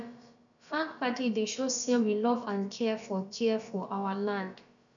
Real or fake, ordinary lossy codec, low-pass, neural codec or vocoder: fake; none; 7.2 kHz; codec, 16 kHz, about 1 kbps, DyCAST, with the encoder's durations